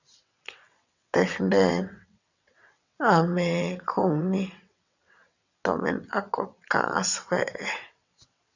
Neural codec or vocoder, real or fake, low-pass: vocoder, 22.05 kHz, 80 mel bands, WaveNeXt; fake; 7.2 kHz